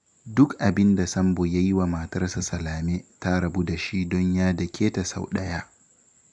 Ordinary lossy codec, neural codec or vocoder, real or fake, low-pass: none; none; real; 10.8 kHz